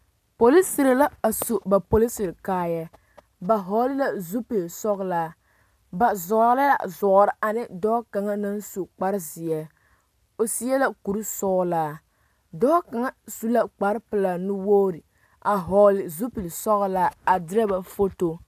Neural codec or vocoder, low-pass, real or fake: none; 14.4 kHz; real